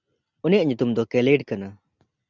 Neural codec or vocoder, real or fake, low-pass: none; real; 7.2 kHz